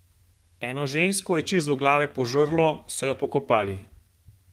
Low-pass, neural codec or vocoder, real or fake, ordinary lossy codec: 14.4 kHz; codec, 32 kHz, 1.9 kbps, SNAC; fake; Opus, 24 kbps